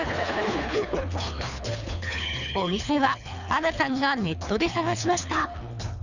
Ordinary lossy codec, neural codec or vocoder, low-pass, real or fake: none; codec, 24 kHz, 3 kbps, HILCodec; 7.2 kHz; fake